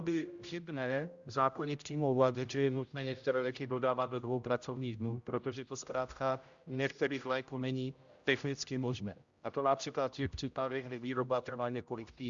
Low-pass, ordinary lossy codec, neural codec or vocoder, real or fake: 7.2 kHz; Opus, 64 kbps; codec, 16 kHz, 0.5 kbps, X-Codec, HuBERT features, trained on general audio; fake